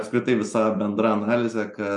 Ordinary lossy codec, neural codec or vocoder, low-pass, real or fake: MP3, 96 kbps; none; 10.8 kHz; real